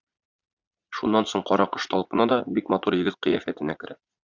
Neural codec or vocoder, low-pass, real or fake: vocoder, 44.1 kHz, 80 mel bands, Vocos; 7.2 kHz; fake